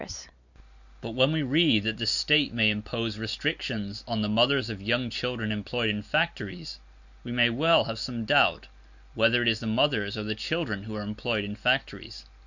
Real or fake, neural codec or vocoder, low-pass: real; none; 7.2 kHz